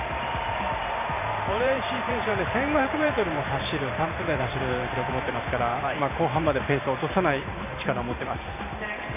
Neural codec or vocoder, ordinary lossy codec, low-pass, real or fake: vocoder, 44.1 kHz, 128 mel bands every 512 samples, BigVGAN v2; none; 3.6 kHz; fake